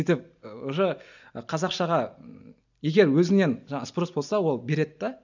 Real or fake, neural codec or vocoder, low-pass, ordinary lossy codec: real; none; 7.2 kHz; none